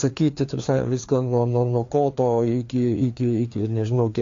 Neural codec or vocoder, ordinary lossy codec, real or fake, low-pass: codec, 16 kHz, 2 kbps, FreqCodec, larger model; AAC, 48 kbps; fake; 7.2 kHz